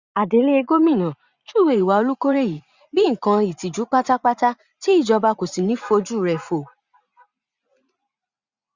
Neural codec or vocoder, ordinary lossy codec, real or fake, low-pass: vocoder, 44.1 kHz, 80 mel bands, Vocos; Opus, 64 kbps; fake; 7.2 kHz